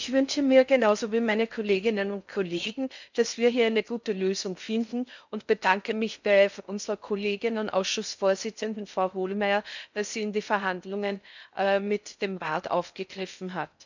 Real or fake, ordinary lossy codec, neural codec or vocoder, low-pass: fake; none; codec, 16 kHz in and 24 kHz out, 0.6 kbps, FocalCodec, streaming, 4096 codes; 7.2 kHz